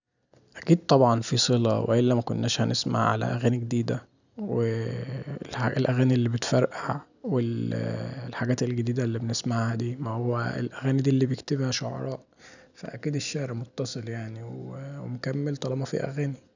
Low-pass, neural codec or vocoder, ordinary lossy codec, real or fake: 7.2 kHz; none; none; real